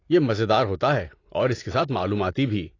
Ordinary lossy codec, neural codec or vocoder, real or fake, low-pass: AAC, 32 kbps; none; real; 7.2 kHz